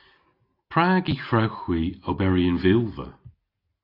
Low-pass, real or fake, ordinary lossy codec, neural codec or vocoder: 5.4 kHz; real; AAC, 32 kbps; none